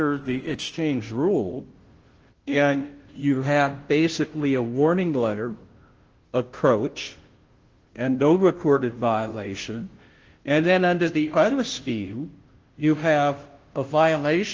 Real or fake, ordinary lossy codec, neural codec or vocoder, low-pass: fake; Opus, 16 kbps; codec, 16 kHz, 0.5 kbps, FunCodec, trained on Chinese and English, 25 frames a second; 7.2 kHz